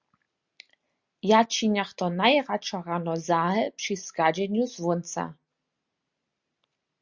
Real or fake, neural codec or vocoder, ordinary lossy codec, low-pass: real; none; Opus, 64 kbps; 7.2 kHz